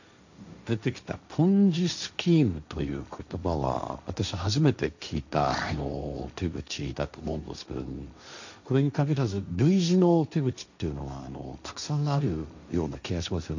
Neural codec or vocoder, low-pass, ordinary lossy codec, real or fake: codec, 16 kHz, 1.1 kbps, Voila-Tokenizer; 7.2 kHz; none; fake